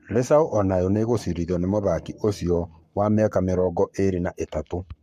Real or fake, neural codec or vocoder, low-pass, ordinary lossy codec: fake; codec, 44.1 kHz, 7.8 kbps, Pupu-Codec; 14.4 kHz; MP3, 64 kbps